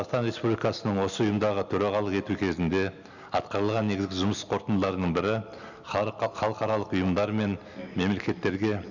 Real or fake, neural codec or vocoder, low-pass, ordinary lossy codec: real; none; 7.2 kHz; none